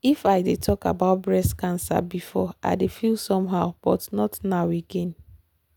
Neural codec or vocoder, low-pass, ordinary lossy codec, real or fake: none; none; none; real